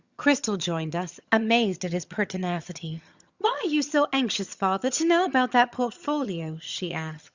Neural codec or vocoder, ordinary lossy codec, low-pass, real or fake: vocoder, 22.05 kHz, 80 mel bands, HiFi-GAN; Opus, 64 kbps; 7.2 kHz; fake